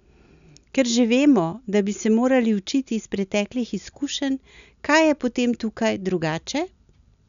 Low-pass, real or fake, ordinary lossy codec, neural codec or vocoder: 7.2 kHz; real; none; none